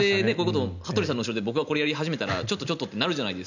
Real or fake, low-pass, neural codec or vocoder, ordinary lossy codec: real; 7.2 kHz; none; none